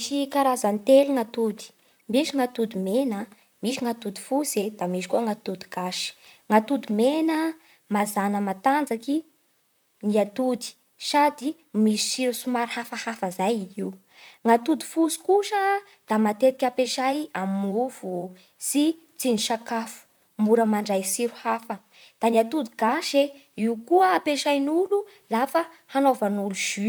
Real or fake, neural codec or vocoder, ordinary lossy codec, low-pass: fake; vocoder, 44.1 kHz, 128 mel bands, Pupu-Vocoder; none; none